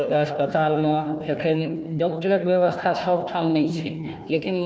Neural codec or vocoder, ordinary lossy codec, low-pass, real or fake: codec, 16 kHz, 1 kbps, FunCodec, trained on Chinese and English, 50 frames a second; none; none; fake